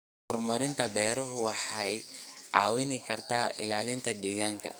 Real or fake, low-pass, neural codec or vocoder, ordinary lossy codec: fake; none; codec, 44.1 kHz, 2.6 kbps, SNAC; none